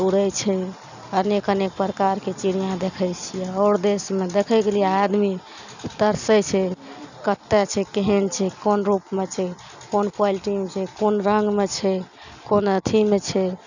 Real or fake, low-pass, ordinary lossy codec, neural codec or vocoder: real; 7.2 kHz; none; none